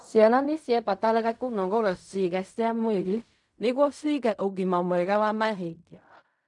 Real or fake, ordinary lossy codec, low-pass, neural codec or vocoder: fake; none; 10.8 kHz; codec, 16 kHz in and 24 kHz out, 0.4 kbps, LongCat-Audio-Codec, fine tuned four codebook decoder